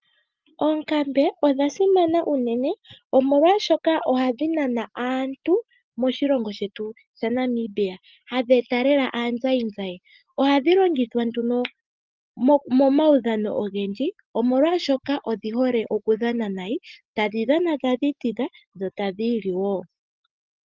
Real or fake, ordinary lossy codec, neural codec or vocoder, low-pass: real; Opus, 32 kbps; none; 7.2 kHz